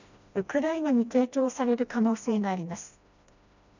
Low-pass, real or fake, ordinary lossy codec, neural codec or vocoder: 7.2 kHz; fake; none; codec, 16 kHz, 1 kbps, FreqCodec, smaller model